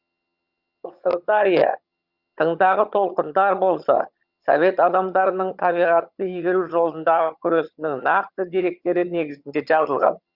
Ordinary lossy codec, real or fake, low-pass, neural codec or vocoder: Opus, 64 kbps; fake; 5.4 kHz; vocoder, 22.05 kHz, 80 mel bands, HiFi-GAN